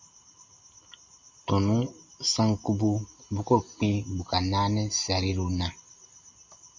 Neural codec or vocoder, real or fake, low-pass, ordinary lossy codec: none; real; 7.2 kHz; MP3, 48 kbps